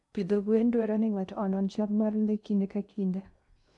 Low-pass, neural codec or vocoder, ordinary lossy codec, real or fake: 10.8 kHz; codec, 16 kHz in and 24 kHz out, 0.6 kbps, FocalCodec, streaming, 2048 codes; none; fake